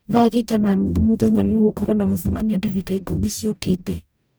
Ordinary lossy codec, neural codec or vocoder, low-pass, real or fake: none; codec, 44.1 kHz, 0.9 kbps, DAC; none; fake